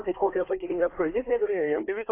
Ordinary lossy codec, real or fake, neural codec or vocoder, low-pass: AAC, 24 kbps; fake; codec, 24 kHz, 1 kbps, SNAC; 3.6 kHz